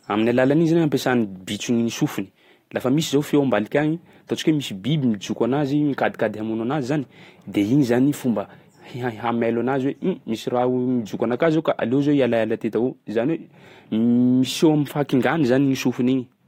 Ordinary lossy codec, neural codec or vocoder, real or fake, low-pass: AAC, 48 kbps; none; real; 19.8 kHz